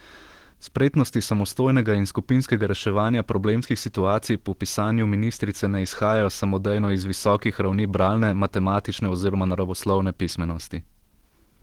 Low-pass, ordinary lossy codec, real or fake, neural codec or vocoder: 19.8 kHz; Opus, 16 kbps; fake; autoencoder, 48 kHz, 128 numbers a frame, DAC-VAE, trained on Japanese speech